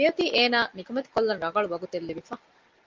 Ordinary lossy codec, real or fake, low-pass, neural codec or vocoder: Opus, 24 kbps; real; 7.2 kHz; none